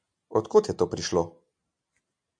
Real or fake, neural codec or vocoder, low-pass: real; none; 9.9 kHz